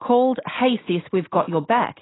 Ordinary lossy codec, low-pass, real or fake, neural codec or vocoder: AAC, 16 kbps; 7.2 kHz; real; none